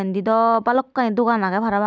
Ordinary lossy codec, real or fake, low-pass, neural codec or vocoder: none; real; none; none